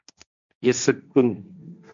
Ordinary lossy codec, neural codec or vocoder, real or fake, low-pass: MP3, 96 kbps; codec, 16 kHz, 1.1 kbps, Voila-Tokenizer; fake; 7.2 kHz